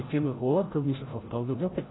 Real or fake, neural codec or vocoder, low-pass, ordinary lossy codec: fake; codec, 16 kHz, 0.5 kbps, FreqCodec, larger model; 7.2 kHz; AAC, 16 kbps